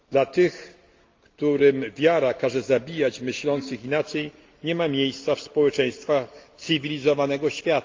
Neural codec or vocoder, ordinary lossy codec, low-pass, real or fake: none; Opus, 32 kbps; 7.2 kHz; real